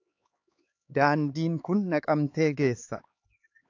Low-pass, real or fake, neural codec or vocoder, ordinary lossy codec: 7.2 kHz; fake; codec, 16 kHz, 4 kbps, X-Codec, HuBERT features, trained on LibriSpeech; AAC, 48 kbps